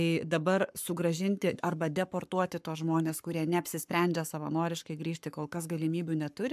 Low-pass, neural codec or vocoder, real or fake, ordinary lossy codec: 14.4 kHz; codec, 44.1 kHz, 7.8 kbps, Pupu-Codec; fake; MP3, 96 kbps